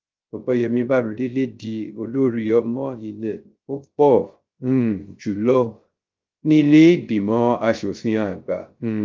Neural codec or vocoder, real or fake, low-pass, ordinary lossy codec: codec, 16 kHz, 0.3 kbps, FocalCodec; fake; 7.2 kHz; Opus, 32 kbps